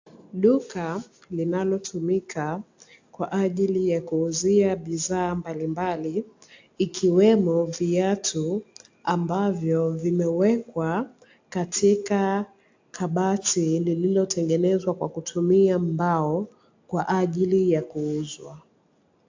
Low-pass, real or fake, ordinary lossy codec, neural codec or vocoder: 7.2 kHz; real; AAC, 48 kbps; none